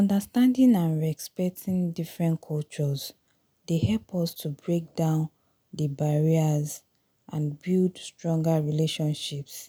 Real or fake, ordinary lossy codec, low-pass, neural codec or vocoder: real; none; none; none